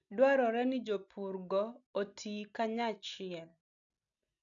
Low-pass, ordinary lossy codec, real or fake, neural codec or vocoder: 7.2 kHz; none; real; none